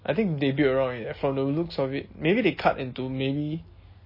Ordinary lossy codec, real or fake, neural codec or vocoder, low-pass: MP3, 24 kbps; real; none; 5.4 kHz